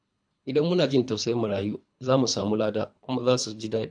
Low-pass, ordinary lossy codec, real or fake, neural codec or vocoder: 9.9 kHz; AAC, 64 kbps; fake; codec, 24 kHz, 3 kbps, HILCodec